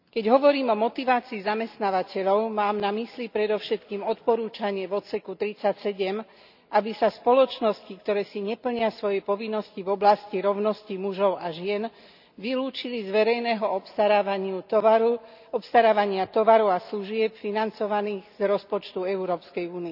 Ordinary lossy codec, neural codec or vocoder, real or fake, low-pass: none; none; real; 5.4 kHz